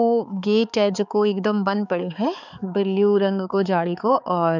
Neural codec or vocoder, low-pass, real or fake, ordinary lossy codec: codec, 16 kHz, 4 kbps, X-Codec, HuBERT features, trained on balanced general audio; 7.2 kHz; fake; none